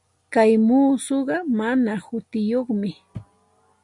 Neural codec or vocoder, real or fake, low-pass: none; real; 10.8 kHz